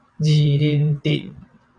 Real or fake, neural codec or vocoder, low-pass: fake; vocoder, 22.05 kHz, 80 mel bands, WaveNeXt; 9.9 kHz